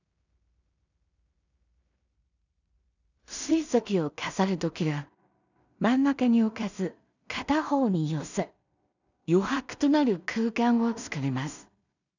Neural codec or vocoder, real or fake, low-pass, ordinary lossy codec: codec, 16 kHz in and 24 kHz out, 0.4 kbps, LongCat-Audio-Codec, two codebook decoder; fake; 7.2 kHz; none